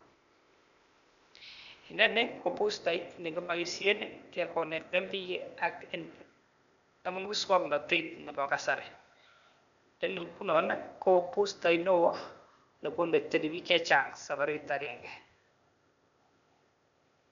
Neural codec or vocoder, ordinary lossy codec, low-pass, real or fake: codec, 16 kHz, 0.8 kbps, ZipCodec; none; 7.2 kHz; fake